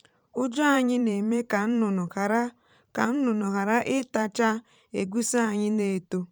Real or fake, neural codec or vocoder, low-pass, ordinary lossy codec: fake; vocoder, 44.1 kHz, 128 mel bands every 512 samples, BigVGAN v2; 19.8 kHz; none